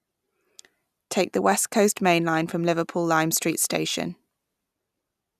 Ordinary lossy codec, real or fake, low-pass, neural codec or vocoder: none; real; 14.4 kHz; none